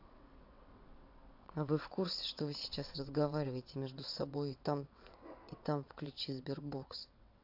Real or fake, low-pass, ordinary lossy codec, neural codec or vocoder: fake; 5.4 kHz; AAC, 48 kbps; vocoder, 22.05 kHz, 80 mel bands, WaveNeXt